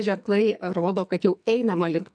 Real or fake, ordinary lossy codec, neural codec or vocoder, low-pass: fake; AAC, 64 kbps; codec, 44.1 kHz, 2.6 kbps, SNAC; 9.9 kHz